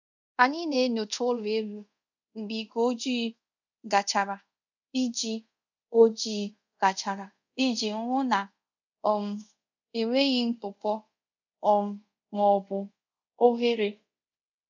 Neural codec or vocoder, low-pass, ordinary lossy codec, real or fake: codec, 24 kHz, 0.5 kbps, DualCodec; 7.2 kHz; none; fake